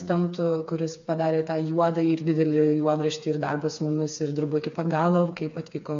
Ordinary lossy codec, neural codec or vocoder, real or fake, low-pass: MP3, 48 kbps; codec, 16 kHz, 4 kbps, FreqCodec, smaller model; fake; 7.2 kHz